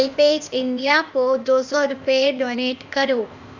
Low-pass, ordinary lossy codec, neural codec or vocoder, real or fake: 7.2 kHz; none; codec, 16 kHz, 0.8 kbps, ZipCodec; fake